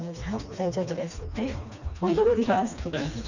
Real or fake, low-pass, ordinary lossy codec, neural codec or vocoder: fake; 7.2 kHz; none; codec, 16 kHz, 2 kbps, FreqCodec, smaller model